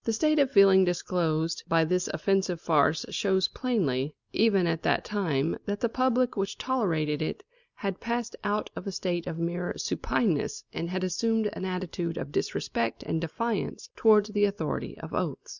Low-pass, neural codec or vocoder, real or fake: 7.2 kHz; none; real